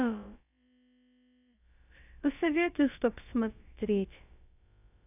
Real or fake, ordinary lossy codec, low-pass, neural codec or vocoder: fake; none; 3.6 kHz; codec, 16 kHz, about 1 kbps, DyCAST, with the encoder's durations